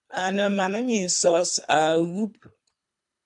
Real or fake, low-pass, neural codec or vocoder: fake; 10.8 kHz; codec, 24 kHz, 3 kbps, HILCodec